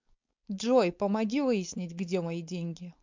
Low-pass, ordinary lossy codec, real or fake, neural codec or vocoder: 7.2 kHz; MP3, 64 kbps; fake; codec, 16 kHz, 4.8 kbps, FACodec